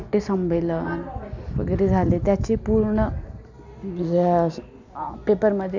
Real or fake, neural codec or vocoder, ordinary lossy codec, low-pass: real; none; none; 7.2 kHz